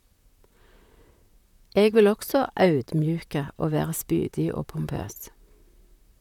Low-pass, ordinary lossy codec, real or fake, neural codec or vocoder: 19.8 kHz; none; fake; vocoder, 44.1 kHz, 128 mel bands, Pupu-Vocoder